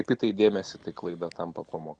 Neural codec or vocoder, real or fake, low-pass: none; real; 9.9 kHz